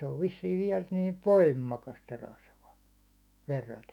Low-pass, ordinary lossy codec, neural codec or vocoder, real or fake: 19.8 kHz; none; autoencoder, 48 kHz, 128 numbers a frame, DAC-VAE, trained on Japanese speech; fake